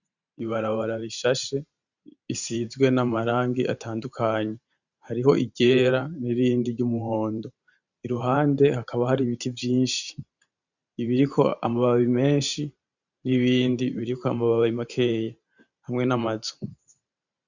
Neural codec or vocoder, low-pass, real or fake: vocoder, 44.1 kHz, 128 mel bands every 512 samples, BigVGAN v2; 7.2 kHz; fake